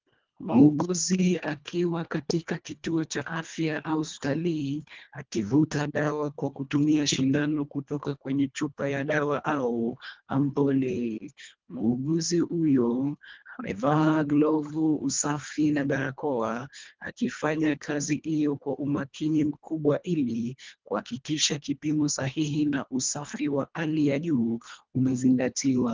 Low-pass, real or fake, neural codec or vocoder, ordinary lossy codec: 7.2 kHz; fake; codec, 24 kHz, 1.5 kbps, HILCodec; Opus, 32 kbps